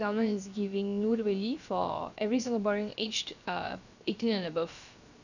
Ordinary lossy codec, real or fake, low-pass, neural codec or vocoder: none; fake; 7.2 kHz; codec, 16 kHz, 0.7 kbps, FocalCodec